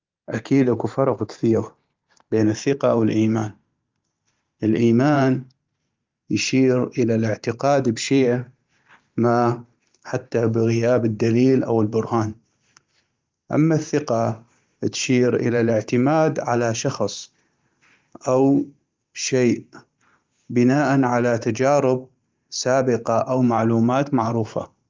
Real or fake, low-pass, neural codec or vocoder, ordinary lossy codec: fake; 7.2 kHz; vocoder, 44.1 kHz, 128 mel bands every 512 samples, BigVGAN v2; Opus, 32 kbps